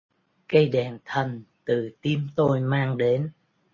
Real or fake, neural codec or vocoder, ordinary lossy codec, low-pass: real; none; MP3, 32 kbps; 7.2 kHz